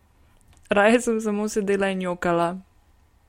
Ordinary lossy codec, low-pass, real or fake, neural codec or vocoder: MP3, 64 kbps; 19.8 kHz; real; none